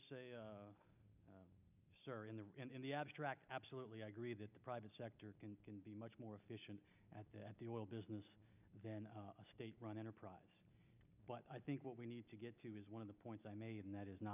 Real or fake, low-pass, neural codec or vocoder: real; 3.6 kHz; none